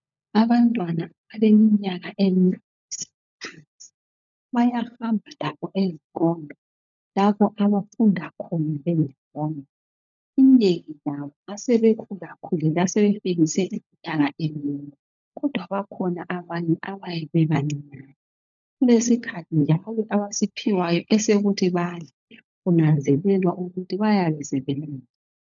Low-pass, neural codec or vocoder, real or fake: 7.2 kHz; codec, 16 kHz, 16 kbps, FunCodec, trained on LibriTTS, 50 frames a second; fake